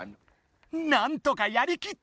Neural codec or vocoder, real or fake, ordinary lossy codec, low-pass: none; real; none; none